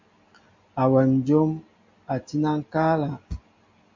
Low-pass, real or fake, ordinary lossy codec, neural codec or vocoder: 7.2 kHz; real; MP3, 48 kbps; none